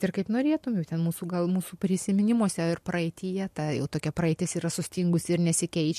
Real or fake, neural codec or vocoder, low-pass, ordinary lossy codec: real; none; 14.4 kHz; MP3, 64 kbps